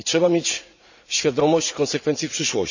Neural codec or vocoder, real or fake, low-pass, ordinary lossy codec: vocoder, 44.1 kHz, 80 mel bands, Vocos; fake; 7.2 kHz; none